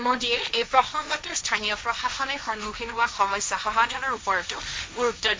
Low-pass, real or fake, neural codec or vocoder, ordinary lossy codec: none; fake; codec, 16 kHz, 1.1 kbps, Voila-Tokenizer; none